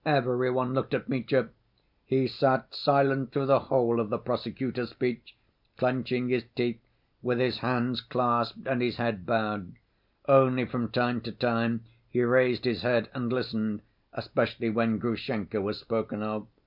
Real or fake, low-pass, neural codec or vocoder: real; 5.4 kHz; none